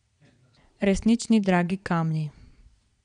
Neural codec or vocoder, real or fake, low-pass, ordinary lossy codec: vocoder, 22.05 kHz, 80 mel bands, Vocos; fake; 9.9 kHz; none